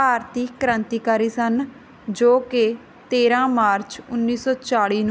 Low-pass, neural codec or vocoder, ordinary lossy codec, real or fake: none; none; none; real